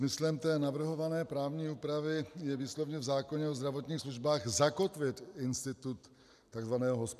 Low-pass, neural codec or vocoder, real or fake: 14.4 kHz; none; real